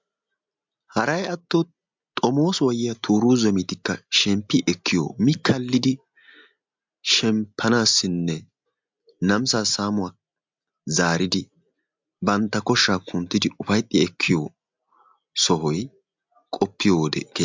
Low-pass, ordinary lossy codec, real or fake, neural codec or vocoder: 7.2 kHz; MP3, 64 kbps; real; none